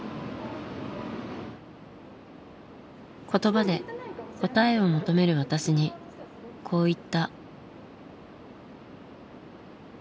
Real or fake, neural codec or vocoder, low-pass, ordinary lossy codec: real; none; none; none